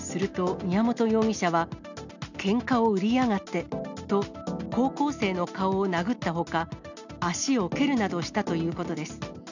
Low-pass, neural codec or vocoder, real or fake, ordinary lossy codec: 7.2 kHz; none; real; none